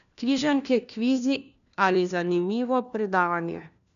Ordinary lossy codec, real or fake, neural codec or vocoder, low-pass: none; fake; codec, 16 kHz, 1 kbps, FunCodec, trained on LibriTTS, 50 frames a second; 7.2 kHz